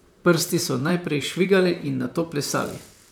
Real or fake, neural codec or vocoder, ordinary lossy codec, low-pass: fake; vocoder, 44.1 kHz, 128 mel bands, Pupu-Vocoder; none; none